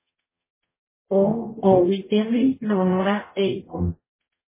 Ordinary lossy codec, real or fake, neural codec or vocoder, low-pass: MP3, 16 kbps; fake; codec, 44.1 kHz, 0.9 kbps, DAC; 3.6 kHz